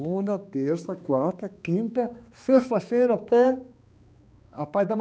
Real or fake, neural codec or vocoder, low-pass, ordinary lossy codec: fake; codec, 16 kHz, 2 kbps, X-Codec, HuBERT features, trained on balanced general audio; none; none